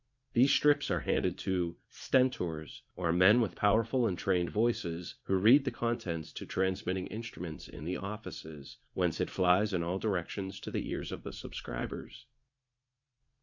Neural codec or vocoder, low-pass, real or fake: vocoder, 44.1 kHz, 80 mel bands, Vocos; 7.2 kHz; fake